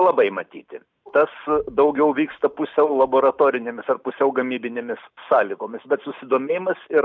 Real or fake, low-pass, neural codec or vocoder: real; 7.2 kHz; none